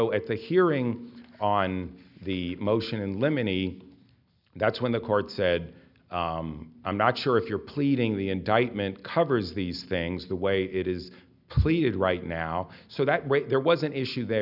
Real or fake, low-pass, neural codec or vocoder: real; 5.4 kHz; none